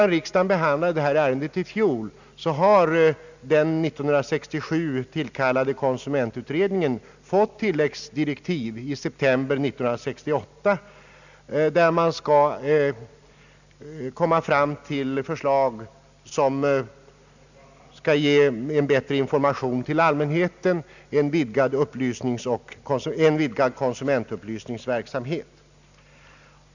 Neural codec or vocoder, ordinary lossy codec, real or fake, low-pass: none; none; real; 7.2 kHz